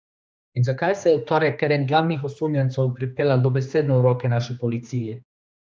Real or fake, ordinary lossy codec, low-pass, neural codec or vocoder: fake; none; none; codec, 16 kHz, 2 kbps, X-Codec, HuBERT features, trained on general audio